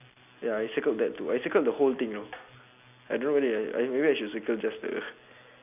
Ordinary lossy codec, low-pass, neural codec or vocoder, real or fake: none; 3.6 kHz; none; real